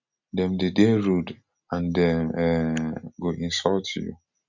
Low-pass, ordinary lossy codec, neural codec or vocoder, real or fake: 7.2 kHz; none; none; real